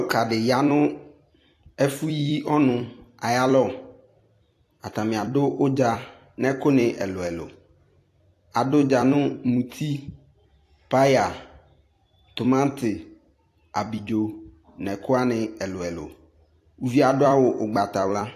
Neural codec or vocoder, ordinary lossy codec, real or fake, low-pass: vocoder, 44.1 kHz, 128 mel bands every 256 samples, BigVGAN v2; AAC, 64 kbps; fake; 14.4 kHz